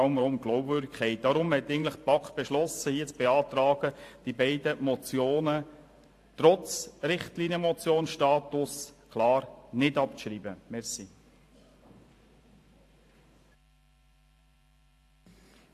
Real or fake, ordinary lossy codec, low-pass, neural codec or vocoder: fake; AAC, 64 kbps; 14.4 kHz; vocoder, 44.1 kHz, 128 mel bands every 512 samples, BigVGAN v2